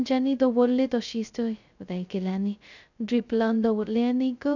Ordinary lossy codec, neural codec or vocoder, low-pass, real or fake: none; codec, 16 kHz, 0.2 kbps, FocalCodec; 7.2 kHz; fake